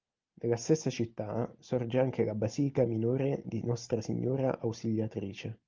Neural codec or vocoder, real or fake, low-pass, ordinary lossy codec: none; real; 7.2 kHz; Opus, 32 kbps